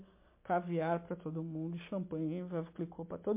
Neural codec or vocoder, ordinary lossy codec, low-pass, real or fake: none; MP3, 24 kbps; 3.6 kHz; real